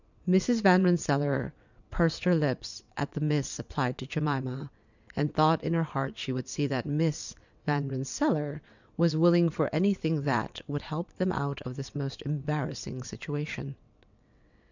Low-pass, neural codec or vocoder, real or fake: 7.2 kHz; vocoder, 22.05 kHz, 80 mel bands, WaveNeXt; fake